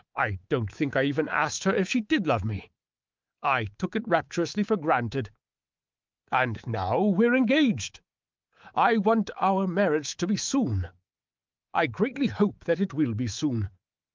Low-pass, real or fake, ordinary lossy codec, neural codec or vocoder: 7.2 kHz; fake; Opus, 24 kbps; codec, 24 kHz, 3.1 kbps, DualCodec